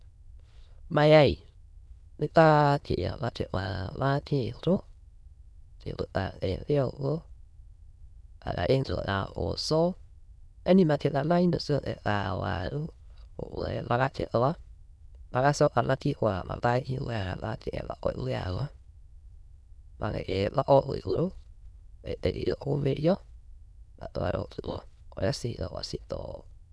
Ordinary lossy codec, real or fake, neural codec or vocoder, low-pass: none; fake; autoencoder, 22.05 kHz, a latent of 192 numbers a frame, VITS, trained on many speakers; none